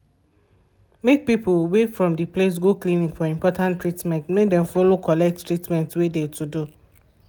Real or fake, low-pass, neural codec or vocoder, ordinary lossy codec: real; none; none; none